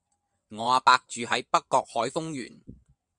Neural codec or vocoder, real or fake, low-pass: vocoder, 22.05 kHz, 80 mel bands, WaveNeXt; fake; 9.9 kHz